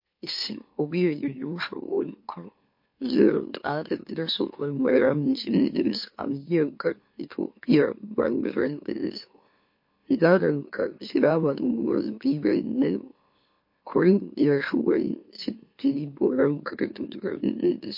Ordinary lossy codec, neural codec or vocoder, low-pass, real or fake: MP3, 32 kbps; autoencoder, 44.1 kHz, a latent of 192 numbers a frame, MeloTTS; 5.4 kHz; fake